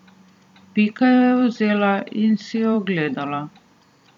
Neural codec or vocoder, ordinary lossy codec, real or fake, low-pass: vocoder, 44.1 kHz, 128 mel bands every 256 samples, BigVGAN v2; none; fake; 19.8 kHz